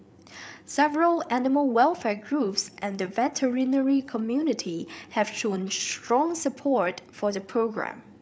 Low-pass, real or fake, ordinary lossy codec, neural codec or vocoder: none; fake; none; codec, 16 kHz, 8 kbps, FreqCodec, larger model